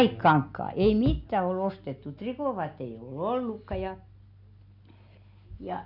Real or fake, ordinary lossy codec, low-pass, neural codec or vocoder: real; none; 5.4 kHz; none